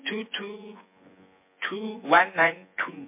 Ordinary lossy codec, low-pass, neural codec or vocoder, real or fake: MP3, 24 kbps; 3.6 kHz; vocoder, 24 kHz, 100 mel bands, Vocos; fake